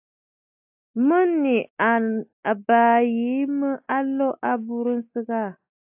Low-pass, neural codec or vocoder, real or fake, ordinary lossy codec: 3.6 kHz; none; real; AAC, 32 kbps